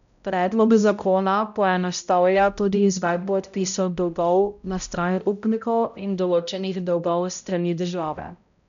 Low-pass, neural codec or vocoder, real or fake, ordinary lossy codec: 7.2 kHz; codec, 16 kHz, 0.5 kbps, X-Codec, HuBERT features, trained on balanced general audio; fake; none